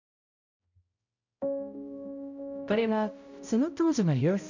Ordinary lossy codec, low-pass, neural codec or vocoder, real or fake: none; 7.2 kHz; codec, 16 kHz, 0.5 kbps, X-Codec, HuBERT features, trained on balanced general audio; fake